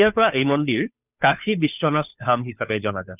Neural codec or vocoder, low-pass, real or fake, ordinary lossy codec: codec, 16 kHz, 2 kbps, FunCodec, trained on Chinese and English, 25 frames a second; 3.6 kHz; fake; none